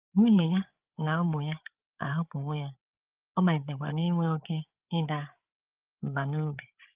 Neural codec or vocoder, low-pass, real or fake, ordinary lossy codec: codec, 16 kHz, 8 kbps, FreqCodec, larger model; 3.6 kHz; fake; Opus, 32 kbps